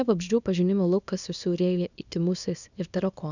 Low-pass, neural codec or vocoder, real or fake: 7.2 kHz; codec, 24 kHz, 0.9 kbps, WavTokenizer, small release; fake